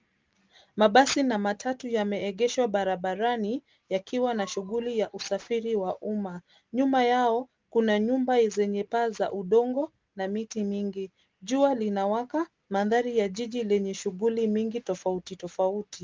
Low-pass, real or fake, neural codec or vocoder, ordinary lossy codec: 7.2 kHz; real; none; Opus, 24 kbps